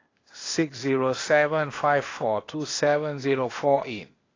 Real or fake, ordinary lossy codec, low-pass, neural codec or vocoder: fake; AAC, 32 kbps; 7.2 kHz; codec, 16 kHz, 0.8 kbps, ZipCodec